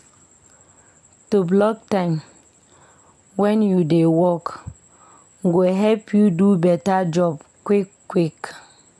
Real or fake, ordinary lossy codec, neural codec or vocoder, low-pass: real; none; none; none